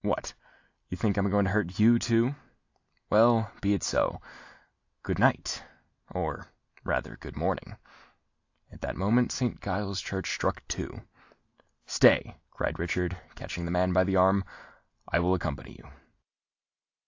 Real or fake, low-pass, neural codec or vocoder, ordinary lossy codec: real; 7.2 kHz; none; AAC, 48 kbps